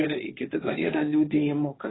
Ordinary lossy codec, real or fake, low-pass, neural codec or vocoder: AAC, 16 kbps; fake; 7.2 kHz; codec, 16 kHz, 1.1 kbps, Voila-Tokenizer